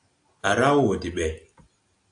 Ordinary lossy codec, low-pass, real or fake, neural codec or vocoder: AAC, 48 kbps; 9.9 kHz; real; none